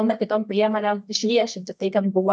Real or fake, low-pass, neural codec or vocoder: fake; 10.8 kHz; codec, 24 kHz, 0.9 kbps, WavTokenizer, medium music audio release